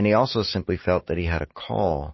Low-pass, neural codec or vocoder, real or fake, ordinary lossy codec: 7.2 kHz; none; real; MP3, 24 kbps